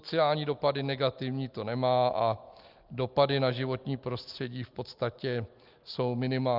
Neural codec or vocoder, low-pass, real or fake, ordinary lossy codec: none; 5.4 kHz; real; Opus, 24 kbps